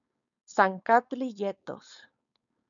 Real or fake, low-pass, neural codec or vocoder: fake; 7.2 kHz; codec, 16 kHz, 4.8 kbps, FACodec